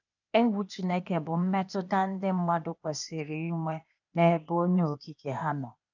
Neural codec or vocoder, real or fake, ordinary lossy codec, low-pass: codec, 16 kHz, 0.8 kbps, ZipCodec; fake; none; 7.2 kHz